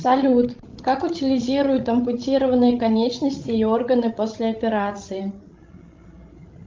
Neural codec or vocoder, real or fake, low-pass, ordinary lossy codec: codec, 16 kHz, 16 kbps, FreqCodec, larger model; fake; 7.2 kHz; Opus, 24 kbps